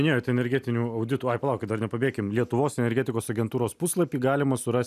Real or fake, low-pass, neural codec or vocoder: real; 14.4 kHz; none